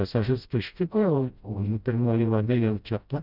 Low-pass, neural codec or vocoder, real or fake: 5.4 kHz; codec, 16 kHz, 0.5 kbps, FreqCodec, smaller model; fake